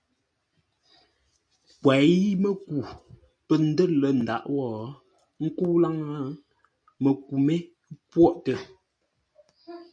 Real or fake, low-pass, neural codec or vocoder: real; 9.9 kHz; none